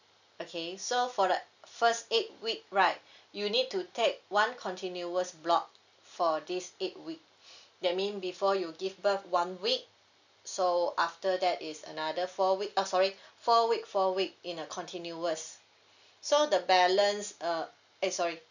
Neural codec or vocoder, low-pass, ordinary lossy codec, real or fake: none; 7.2 kHz; none; real